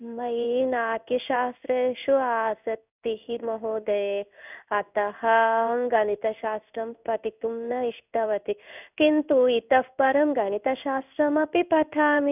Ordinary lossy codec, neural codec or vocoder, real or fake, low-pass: none; codec, 16 kHz in and 24 kHz out, 1 kbps, XY-Tokenizer; fake; 3.6 kHz